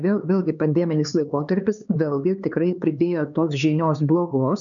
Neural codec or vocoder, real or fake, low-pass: codec, 16 kHz, 4 kbps, X-Codec, HuBERT features, trained on LibriSpeech; fake; 7.2 kHz